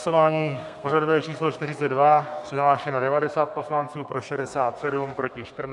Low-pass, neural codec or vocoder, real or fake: 10.8 kHz; codec, 32 kHz, 1.9 kbps, SNAC; fake